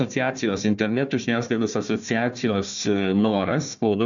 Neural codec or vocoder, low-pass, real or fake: codec, 16 kHz, 1 kbps, FunCodec, trained on Chinese and English, 50 frames a second; 7.2 kHz; fake